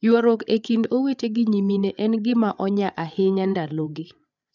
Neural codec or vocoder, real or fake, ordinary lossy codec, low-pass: codec, 16 kHz, 8 kbps, FreqCodec, larger model; fake; none; 7.2 kHz